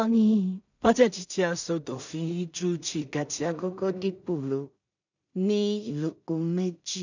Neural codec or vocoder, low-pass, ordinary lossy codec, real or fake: codec, 16 kHz in and 24 kHz out, 0.4 kbps, LongCat-Audio-Codec, two codebook decoder; 7.2 kHz; none; fake